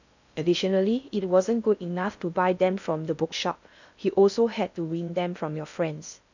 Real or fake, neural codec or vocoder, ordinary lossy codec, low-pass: fake; codec, 16 kHz in and 24 kHz out, 0.6 kbps, FocalCodec, streaming, 4096 codes; none; 7.2 kHz